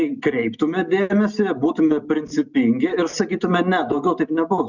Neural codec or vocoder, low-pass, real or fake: none; 7.2 kHz; real